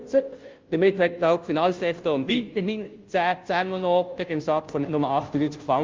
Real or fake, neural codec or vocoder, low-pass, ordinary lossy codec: fake; codec, 16 kHz, 0.5 kbps, FunCodec, trained on Chinese and English, 25 frames a second; 7.2 kHz; Opus, 32 kbps